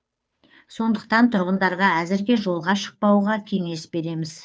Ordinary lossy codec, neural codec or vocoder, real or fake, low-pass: none; codec, 16 kHz, 2 kbps, FunCodec, trained on Chinese and English, 25 frames a second; fake; none